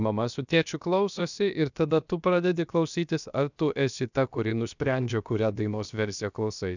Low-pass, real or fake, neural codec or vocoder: 7.2 kHz; fake; codec, 16 kHz, about 1 kbps, DyCAST, with the encoder's durations